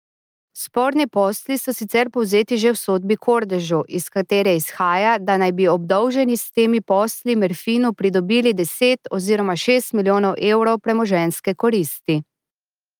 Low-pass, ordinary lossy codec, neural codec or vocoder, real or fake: 19.8 kHz; Opus, 32 kbps; none; real